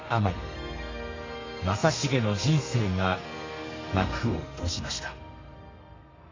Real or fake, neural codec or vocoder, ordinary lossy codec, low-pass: fake; codec, 32 kHz, 1.9 kbps, SNAC; AAC, 32 kbps; 7.2 kHz